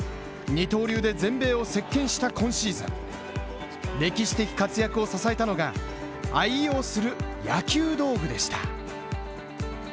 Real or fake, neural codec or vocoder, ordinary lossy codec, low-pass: real; none; none; none